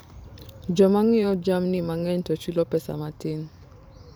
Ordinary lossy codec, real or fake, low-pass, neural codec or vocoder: none; fake; none; vocoder, 44.1 kHz, 128 mel bands every 512 samples, BigVGAN v2